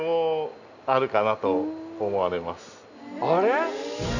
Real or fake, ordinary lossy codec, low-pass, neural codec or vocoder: real; none; 7.2 kHz; none